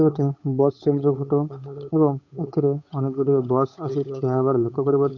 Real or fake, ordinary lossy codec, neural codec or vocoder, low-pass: fake; none; codec, 16 kHz, 8 kbps, FunCodec, trained on Chinese and English, 25 frames a second; 7.2 kHz